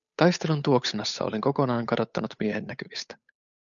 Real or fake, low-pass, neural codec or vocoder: fake; 7.2 kHz; codec, 16 kHz, 8 kbps, FunCodec, trained on Chinese and English, 25 frames a second